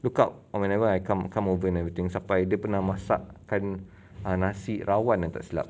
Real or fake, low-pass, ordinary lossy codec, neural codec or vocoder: real; none; none; none